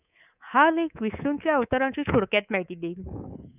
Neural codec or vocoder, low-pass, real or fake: codec, 24 kHz, 3.1 kbps, DualCodec; 3.6 kHz; fake